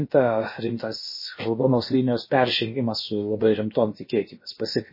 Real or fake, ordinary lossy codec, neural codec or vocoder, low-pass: fake; MP3, 24 kbps; codec, 16 kHz, about 1 kbps, DyCAST, with the encoder's durations; 5.4 kHz